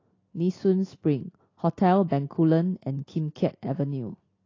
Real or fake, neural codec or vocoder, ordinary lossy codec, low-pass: real; none; AAC, 32 kbps; 7.2 kHz